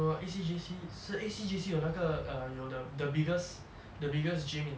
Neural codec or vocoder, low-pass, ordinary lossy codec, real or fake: none; none; none; real